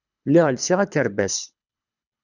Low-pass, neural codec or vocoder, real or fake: 7.2 kHz; codec, 24 kHz, 6 kbps, HILCodec; fake